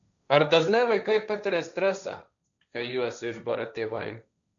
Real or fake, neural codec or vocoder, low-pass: fake; codec, 16 kHz, 1.1 kbps, Voila-Tokenizer; 7.2 kHz